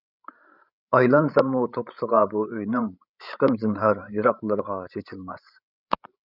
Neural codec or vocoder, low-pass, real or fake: codec, 16 kHz, 16 kbps, FreqCodec, larger model; 5.4 kHz; fake